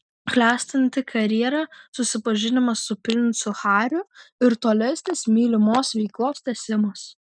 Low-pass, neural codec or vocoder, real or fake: 9.9 kHz; none; real